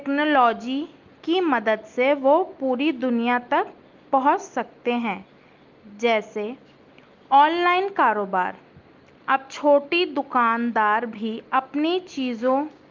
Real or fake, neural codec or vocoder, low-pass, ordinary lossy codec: real; none; 7.2 kHz; Opus, 24 kbps